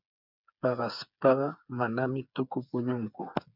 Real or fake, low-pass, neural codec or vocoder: fake; 5.4 kHz; codec, 16 kHz, 8 kbps, FreqCodec, smaller model